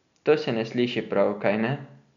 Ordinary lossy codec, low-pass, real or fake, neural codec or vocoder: MP3, 96 kbps; 7.2 kHz; real; none